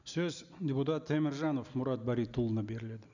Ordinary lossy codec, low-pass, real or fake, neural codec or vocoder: none; 7.2 kHz; real; none